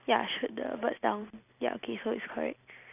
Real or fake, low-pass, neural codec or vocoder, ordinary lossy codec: real; 3.6 kHz; none; none